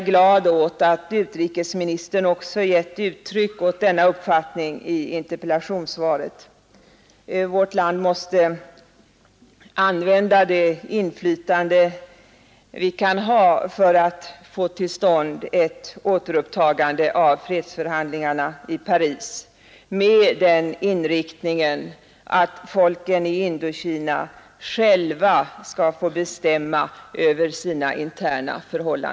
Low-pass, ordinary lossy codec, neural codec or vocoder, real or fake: none; none; none; real